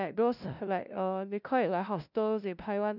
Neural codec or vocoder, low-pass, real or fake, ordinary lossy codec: codec, 16 kHz, 0.5 kbps, FunCodec, trained on LibriTTS, 25 frames a second; 5.4 kHz; fake; none